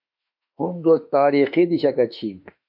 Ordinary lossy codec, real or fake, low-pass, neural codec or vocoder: MP3, 48 kbps; fake; 5.4 kHz; autoencoder, 48 kHz, 32 numbers a frame, DAC-VAE, trained on Japanese speech